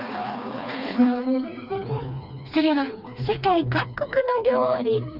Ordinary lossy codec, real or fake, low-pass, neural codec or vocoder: none; fake; 5.4 kHz; codec, 16 kHz, 2 kbps, FreqCodec, smaller model